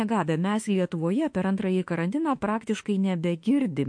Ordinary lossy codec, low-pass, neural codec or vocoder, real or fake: MP3, 48 kbps; 9.9 kHz; autoencoder, 48 kHz, 32 numbers a frame, DAC-VAE, trained on Japanese speech; fake